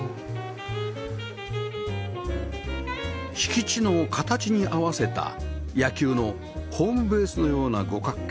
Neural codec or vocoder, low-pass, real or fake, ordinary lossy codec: none; none; real; none